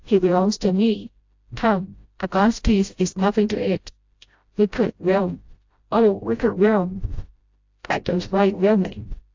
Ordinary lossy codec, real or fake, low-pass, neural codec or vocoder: AAC, 48 kbps; fake; 7.2 kHz; codec, 16 kHz, 0.5 kbps, FreqCodec, smaller model